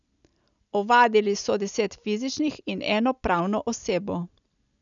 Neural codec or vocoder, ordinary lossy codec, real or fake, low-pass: none; none; real; 7.2 kHz